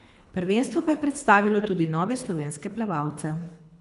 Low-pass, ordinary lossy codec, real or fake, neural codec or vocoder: 10.8 kHz; none; fake; codec, 24 kHz, 3 kbps, HILCodec